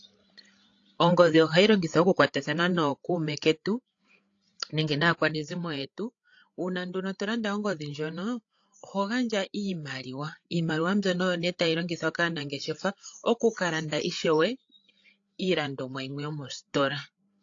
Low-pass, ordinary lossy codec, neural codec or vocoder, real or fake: 7.2 kHz; AAC, 48 kbps; codec, 16 kHz, 8 kbps, FreqCodec, larger model; fake